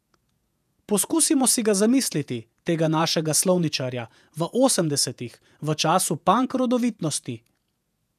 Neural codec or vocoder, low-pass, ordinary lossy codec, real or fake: vocoder, 48 kHz, 128 mel bands, Vocos; 14.4 kHz; none; fake